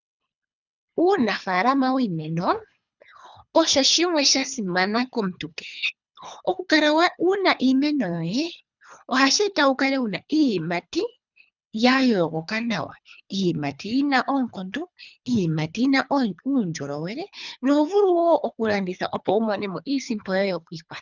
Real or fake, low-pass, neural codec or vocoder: fake; 7.2 kHz; codec, 24 kHz, 3 kbps, HILCodec